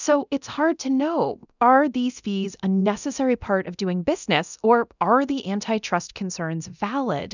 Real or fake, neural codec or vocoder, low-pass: fake; codec, 24 kHz, 0.9 kbps, DualCodec; 7.2 kHz